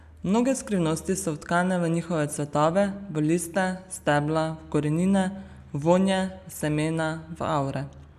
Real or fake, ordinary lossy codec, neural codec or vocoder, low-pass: real; none; none; 14.4 kHz